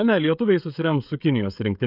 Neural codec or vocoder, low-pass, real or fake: codec, 16 kHz, 16 kbps, FreqCodec, smaller model; 5.4 kHz; fake